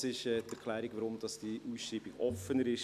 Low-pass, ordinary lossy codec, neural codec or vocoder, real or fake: 14.4 kHz; none; none; real